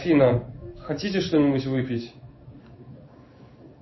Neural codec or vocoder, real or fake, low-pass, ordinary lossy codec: codec, 16 kHz in and 24 kHz out, 1 kbps, XY-Tokenizer; fake; 7.2 kHz; MP3, 24 kbps